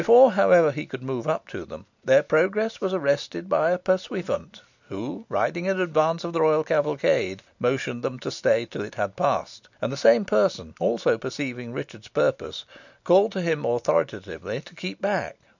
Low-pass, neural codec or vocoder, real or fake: 7.2 kHz; none; real